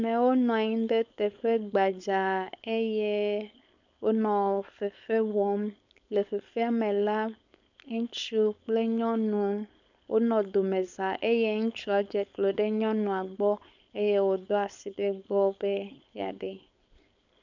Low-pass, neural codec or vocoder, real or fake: 7.2 kHz; codec, 16 kHz, 4.8 kbps, FACodec; fake